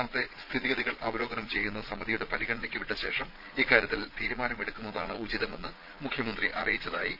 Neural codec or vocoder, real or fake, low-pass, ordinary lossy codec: vocoder, 22.05 kHz, 80 mel bands, Vocos; fake; 5.4 kHz; none